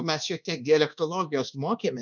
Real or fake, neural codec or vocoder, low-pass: fake; codec, 24 kHz, 1.2 kbps, DualCodec; 7.2 kHz